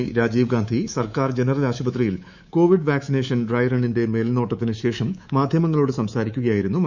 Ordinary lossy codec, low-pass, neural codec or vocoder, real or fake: none; 7.2 kHz; codec, 24 kHz, 3.1 kbps, DualCodec; fake